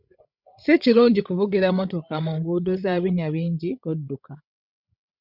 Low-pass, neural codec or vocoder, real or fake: 5.4 kHz; codec, 16 kHz, 16 kbps, FreqCodec, larger model; fake